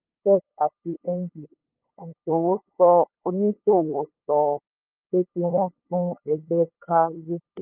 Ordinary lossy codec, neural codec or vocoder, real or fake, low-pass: Opus, 24 kbps; codec, 16 kHz, 8 kbps, FunCodec, trained on LibriTTS, 25 frames a second; fake; 3.6 kHz